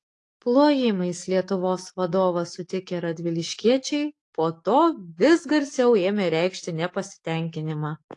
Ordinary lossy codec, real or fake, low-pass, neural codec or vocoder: AAC, 48 kbps; fake; 10.8 kHz; codec, 44.1 kHz, 7.8 kbps, DAC